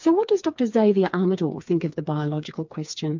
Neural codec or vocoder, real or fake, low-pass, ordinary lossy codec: codec, 16 kHz, 4 kbps, FreqCodec, smaller model; fake; 7.2 kHz; MP3, 64 kbps